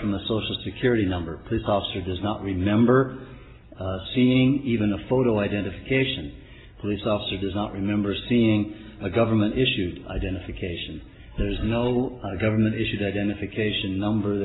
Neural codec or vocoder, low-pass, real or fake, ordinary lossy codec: none; 7.2 kHz; real; AAC, 16 kbps